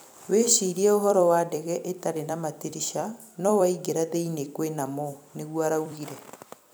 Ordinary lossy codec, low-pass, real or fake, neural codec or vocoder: none; none; fake; vocoder, 44.1 kHz, 128 mel bands every 256 samples, BigVGAN v2